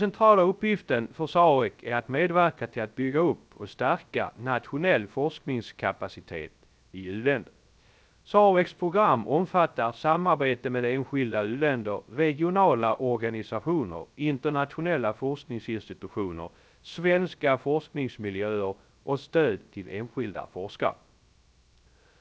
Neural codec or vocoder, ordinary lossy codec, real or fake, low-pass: codec, 16 kHz, 0.3 kbps, FocalCodec; none; fake; none